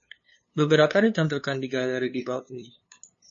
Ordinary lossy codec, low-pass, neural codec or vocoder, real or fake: MP3, 32 kbps; 7.2 kHz; codec, 16 kHz, 2 kbps, FunCodec, trained on LibriTTS, 25 frames a second; fake